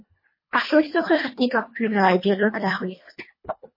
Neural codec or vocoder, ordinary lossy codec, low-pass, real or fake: codec, 24 kHz, 1.5 kbps, HILCodec; MP3, 24 kbps; 5.4 kHz; fake